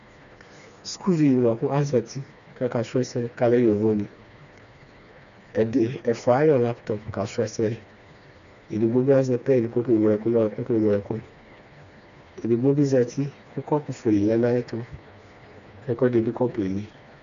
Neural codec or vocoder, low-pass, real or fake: codec, 16 kHz, 2 kbps, FreqCodec, smaller model; 7.2 kHz; fake